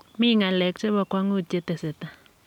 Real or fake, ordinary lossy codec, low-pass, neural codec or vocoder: real; none; 19.8 kHz; none